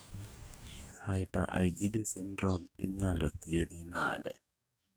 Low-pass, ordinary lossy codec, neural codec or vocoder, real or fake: none; none; codec, 44.1 kHz, 2.6 kbps, DAC; fake